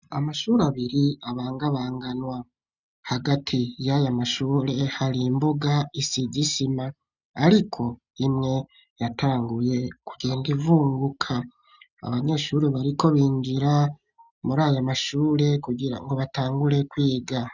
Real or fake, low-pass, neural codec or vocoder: real; 7.2 kHz; none